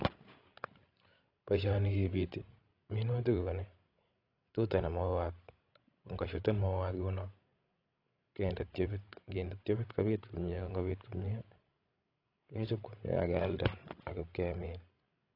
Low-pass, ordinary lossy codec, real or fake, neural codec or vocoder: 5.4 kHz; AAC, 32 kbps; fake; codec, 16 kHz, 16 kbps, FreqCodec, larger model